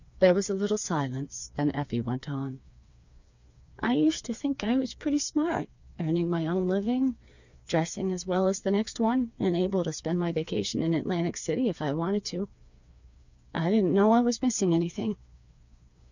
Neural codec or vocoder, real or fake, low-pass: codec, 16 kHz, 4 kbps, FreqCodec, smaller model; fake; 7.2 kHz